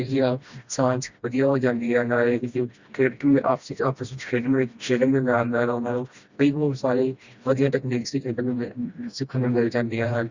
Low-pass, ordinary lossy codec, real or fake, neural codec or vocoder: 7.2 kHz; Opus, 64 kbps; fake; codec, 16 kHz, 1 kbps, FreqCodec, smaller model